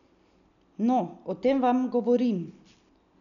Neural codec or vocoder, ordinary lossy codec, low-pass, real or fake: none; none; 7.2 kHz; real